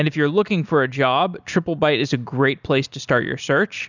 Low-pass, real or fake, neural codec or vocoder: 7.2 kHz; real; none